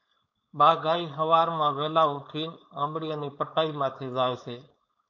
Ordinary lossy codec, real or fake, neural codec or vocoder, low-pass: MP3, 48 kbps; fake; codec, 16 kHz, 4.8 kbps, FACodec; 7.2 kHz